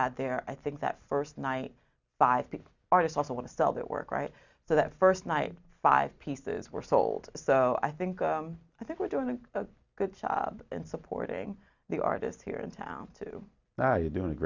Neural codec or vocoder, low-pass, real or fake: none; 7.2 kHz; real